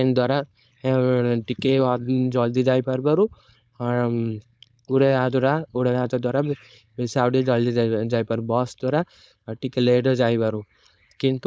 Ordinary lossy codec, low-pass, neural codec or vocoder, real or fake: none; none; codec, 16 kHz, 4.8 kbps, FACodec; fake